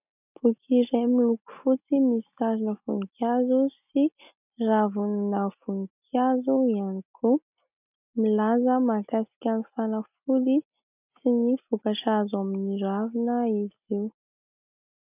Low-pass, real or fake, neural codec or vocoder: 3.6 kHz; real; none